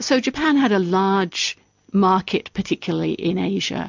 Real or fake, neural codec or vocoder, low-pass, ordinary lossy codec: real; none; 7.2 kHz; MP3, 64 kbps